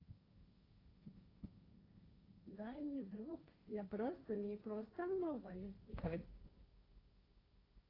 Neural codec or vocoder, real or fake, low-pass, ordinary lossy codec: codec, 16 kHz, 1.1 kbps, Voila-Tokenizer; fake; 5.4 kHz; AAC, 24 kbps